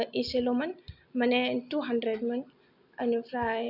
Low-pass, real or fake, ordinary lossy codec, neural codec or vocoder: 5.4 kHz; real; none; none